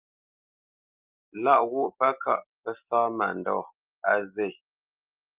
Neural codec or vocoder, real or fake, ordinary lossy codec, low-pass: none; real; Opus, 24 kbps; 3.6 kHz